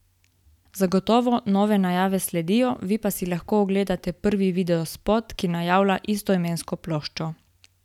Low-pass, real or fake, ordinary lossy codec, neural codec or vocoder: 19.8 kHz; real; none; none